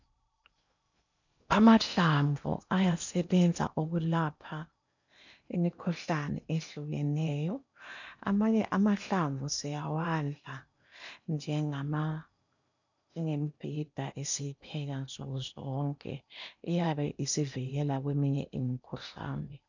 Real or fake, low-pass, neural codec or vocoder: fake; 7.2 kHz; codec, 16 kHz in and 24 kHz out, 0.8 kbps, FocalCodec, streaming, 65536 codes